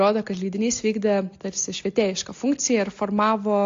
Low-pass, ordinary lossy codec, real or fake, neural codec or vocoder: 7.2 kHz; AAC, 48 kbps; real; none